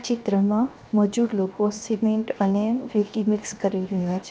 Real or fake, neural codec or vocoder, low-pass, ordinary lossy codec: fake; codec, 16 kHz, 0.7 kbps, FocalCodec; none; none